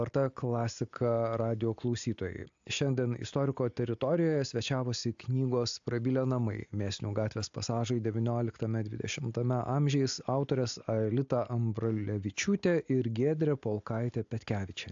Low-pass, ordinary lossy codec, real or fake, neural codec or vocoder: 7.2 kHz; AAC, 64 kbps; real; none